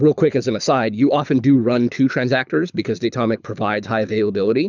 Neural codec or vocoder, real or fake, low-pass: codec, 24 kHz, 6 kbps, HILCodec; fake; 7.2 kHz